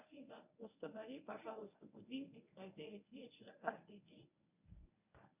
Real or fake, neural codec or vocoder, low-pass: fake; codec, 24 kHz, 0.9 kbps, WavTokenizer, medium speech release version 1; 3.6 kHz